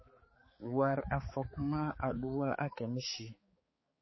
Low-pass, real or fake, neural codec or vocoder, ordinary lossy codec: 7.2 kHz; fake; codec, 16 kHz, 4 kbps, X-Codec, HuBERT features, trained on balanced general audio; MP3, 24 kbps